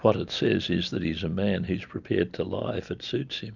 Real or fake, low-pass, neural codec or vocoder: real; 7.2 kHz; none